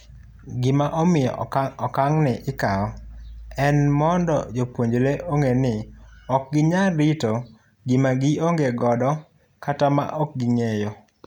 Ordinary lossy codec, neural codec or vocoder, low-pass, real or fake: none; none; 19.8 kHz; real